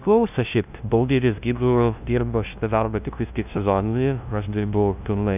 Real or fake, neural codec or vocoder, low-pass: fake; codec, 16 kHz, 0.5 kbps, FunCodec, trained on LibriTTS, 25 frames a second; 3.6 kHz